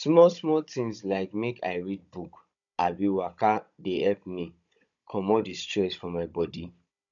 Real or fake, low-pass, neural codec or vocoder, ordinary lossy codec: fake; 7.2 kHz; codec, 16 kHz, 16 kbps, FunCodec, trained on Chinese and English, 50 frames a second; none